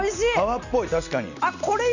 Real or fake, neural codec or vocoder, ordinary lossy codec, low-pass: real; none; none; 7.2 kHz